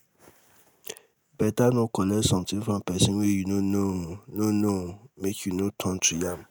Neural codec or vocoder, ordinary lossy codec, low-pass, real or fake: none; none; none; real